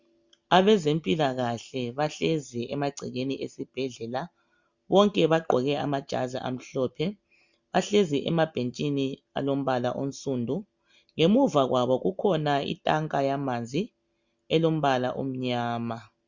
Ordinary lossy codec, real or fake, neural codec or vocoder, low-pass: Opus, 64 kbps; real; none; 7.2 kHz